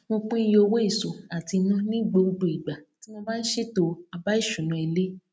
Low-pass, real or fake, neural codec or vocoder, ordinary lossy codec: none; real; none; none